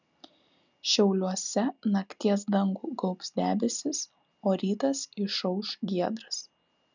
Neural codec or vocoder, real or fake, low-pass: none; real; 7.2 kHz